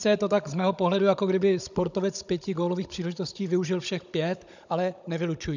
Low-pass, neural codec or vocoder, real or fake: 7.2 kHz; codec, 16 kHz, 16 kbps, FunCodec, trained on Chinese and English, 50 frames a second; fake